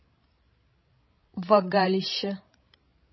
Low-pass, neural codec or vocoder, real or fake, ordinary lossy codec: 7.2 kHz; codec, 16 kHz, 16 kbps, FreqCodec, larger model; fake; MP3, 24 kbps